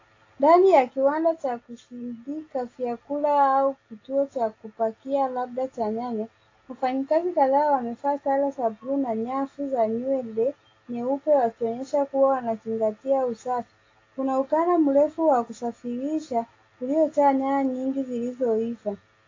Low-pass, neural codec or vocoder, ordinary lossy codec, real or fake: 7.2 kHz; none; AAC, 32 kbps; real